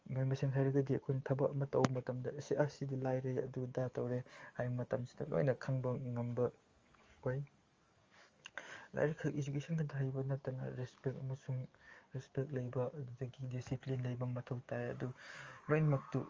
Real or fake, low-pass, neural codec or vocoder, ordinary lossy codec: fake; 7.2 kHz; codec, 44.1 kHz, 7.8 kbps, DAC; Opus, 32 kbps